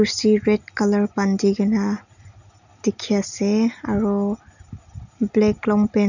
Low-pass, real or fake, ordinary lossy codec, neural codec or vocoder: 7.2 kHz; real; none; none